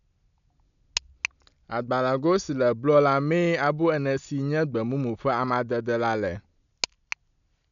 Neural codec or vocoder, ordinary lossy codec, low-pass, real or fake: none; none; 7.2 kHz; real